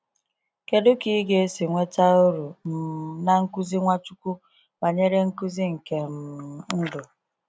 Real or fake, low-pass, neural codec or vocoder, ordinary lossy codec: real; none; none; none